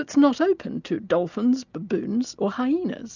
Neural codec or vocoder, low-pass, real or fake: none; 7.2 kHz; real